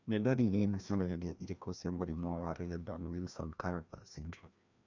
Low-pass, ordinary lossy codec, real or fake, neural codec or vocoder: 7.2 kHz; none; fake; codec, 16 kHz, 1 kbps, FreqCodec, larger model